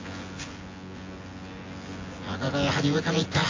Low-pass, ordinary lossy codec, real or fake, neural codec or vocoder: 7.2 kHz; AAC, 32 kbps; fake; vocoder, 24 kHz, 100 mel bands, Vocos